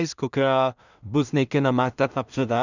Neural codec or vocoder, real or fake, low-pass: codec, 16 kHz in and 24 kHz out, 0.4 kbps, LongCat-Audio-Codec, two codebook decoder; fake; 7.2 kHz